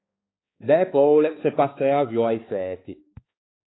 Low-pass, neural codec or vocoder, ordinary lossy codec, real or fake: 7.2 kHz; codec, 16 kHz, 4 kbps, X-Codec, HuBERT features, trained on balanced general audio; AAC, 16 kbps; fake